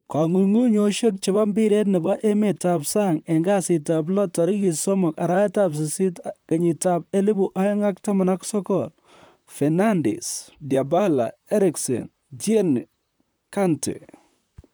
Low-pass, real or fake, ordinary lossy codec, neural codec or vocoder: none; fake; none; vocoder, 44.1 kHz, 128 mel bands, Pupu-Vocoder